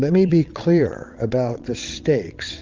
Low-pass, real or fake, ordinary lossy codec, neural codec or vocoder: 7.2 kHz; fake; Opus, 32 kbps; vocoder, 22.05 kHz, 80 mel bands, WaveNeXt